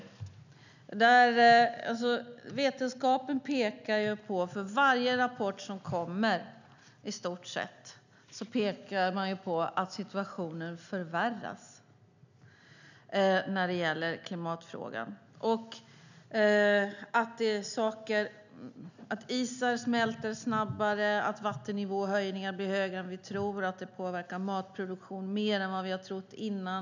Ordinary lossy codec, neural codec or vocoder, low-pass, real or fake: none; none; 7.2 kHz; real